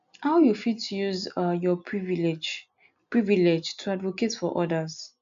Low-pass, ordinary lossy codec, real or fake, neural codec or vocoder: 7.2 kHz; AAC, 64 kbps; real; none